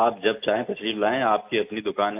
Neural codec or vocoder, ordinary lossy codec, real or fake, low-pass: none; AAC, 32 kbps; real; 3.6 kHz